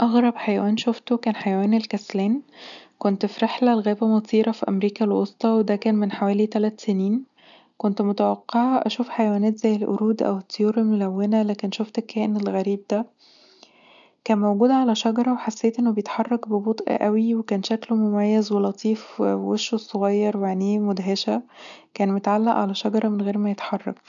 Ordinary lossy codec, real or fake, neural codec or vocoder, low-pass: none; real; none; 7.2 kHz